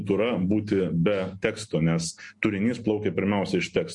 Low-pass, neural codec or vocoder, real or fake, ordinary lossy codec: 10.8 kHz; none; real; MP3, 48 kbps